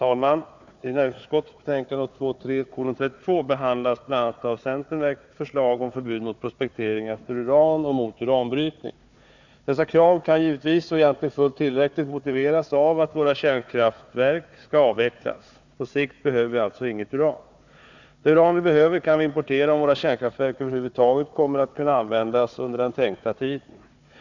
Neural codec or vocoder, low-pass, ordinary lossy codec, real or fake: codec, 16 kHz, 4 kbps, FunCodec, trained on Chinese and English, 50 frames a second; 7.2 kHz; none; fake